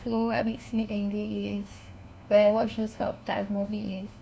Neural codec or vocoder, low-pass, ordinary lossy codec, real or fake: codec, 16 kHz, 1 kbps, FunCodec, trained on LibriTTS, 50 frames a second; none; none; fake